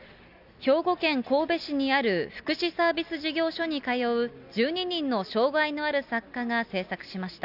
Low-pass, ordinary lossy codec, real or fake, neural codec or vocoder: 5.4 kHz; none; real; none